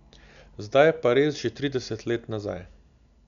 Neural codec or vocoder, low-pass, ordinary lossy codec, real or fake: none; 7.2 kHz; none; real